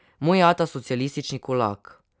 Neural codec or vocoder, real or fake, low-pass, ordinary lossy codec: none; real; none; none